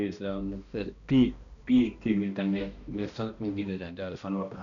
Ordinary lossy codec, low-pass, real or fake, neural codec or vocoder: none; 7.2 kHz; fake; codec, 16 kHz, 1 kbps, X-Codec, HuBERT features, trained on balanced general audio